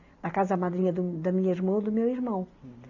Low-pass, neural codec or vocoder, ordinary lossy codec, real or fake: 7.2 kHz; none; none; real